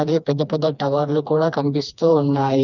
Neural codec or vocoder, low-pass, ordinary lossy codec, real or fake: codec, 16 kHz, 2 kbps, FreqCodec, smaller model; 7.2 kHz; none; fake